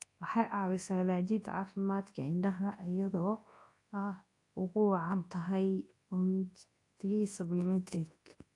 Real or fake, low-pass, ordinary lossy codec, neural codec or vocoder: fake; 10.8 kHz; AAC, 48 kbps; codec, 24 kHz, 0.9 kbps, WavTokenizer, large speech release